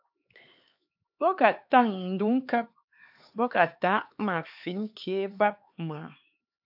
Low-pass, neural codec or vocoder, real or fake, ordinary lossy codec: 5.4 kHz; codec, 16 kHz, 4 kbps, X-Codec, HuBERT features, trained on LibriSpeech; fake; AAC, 48 kbps